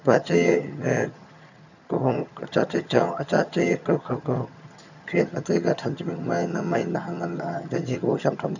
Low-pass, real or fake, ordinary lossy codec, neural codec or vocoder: 7.2 kHz; fake; none; vocoder, 22.05 kHz, 80 mel bands, HiFi-GAN